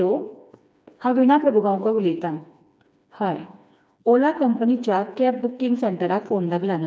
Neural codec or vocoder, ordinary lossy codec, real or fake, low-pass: codec, 16 kHz, 2 kbps, FreqCodec, smaller model; none; fake; none